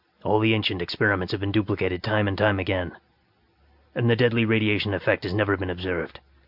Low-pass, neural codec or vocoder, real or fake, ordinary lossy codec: 5.4 kHz; none; real; AAC, 48 kbps